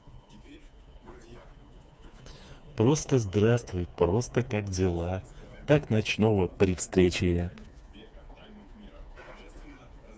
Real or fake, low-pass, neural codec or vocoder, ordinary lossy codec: fake; none; codec, 16 kHz, 4 kbps, FreqCodec, smaller model; none